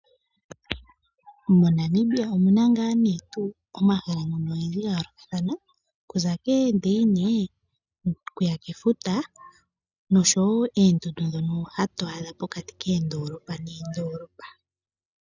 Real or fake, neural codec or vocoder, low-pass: real; none; 7.2 kHz